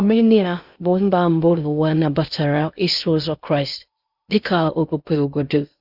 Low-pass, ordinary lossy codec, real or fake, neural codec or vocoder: 5.4 kHz; Opus, 64 kbps; fake; codec, 16 kHz in and 24 kHz out, 0.6 kbps, FocalCodec, streaming, 2048 codes